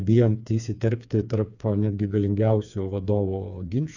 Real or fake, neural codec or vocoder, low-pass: fake; codec, 16 kHz, 4 kbps, FreqCodec, smaller model; 7.2 kHz